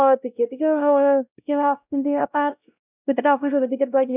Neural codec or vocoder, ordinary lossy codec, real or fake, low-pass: codec, 16 kHz, 0.5 kbps, X-Codec, WavLM features, trained on Multilingual LibriSpeech; none; fake; 3.6 kHz